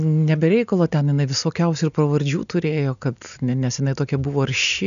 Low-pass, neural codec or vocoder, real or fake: 7.2 kHz; none; real